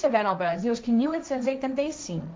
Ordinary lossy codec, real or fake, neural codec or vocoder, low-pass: none; fake; codec, 16 kHz, 1.1 kbps, Voila-Tokenizer; none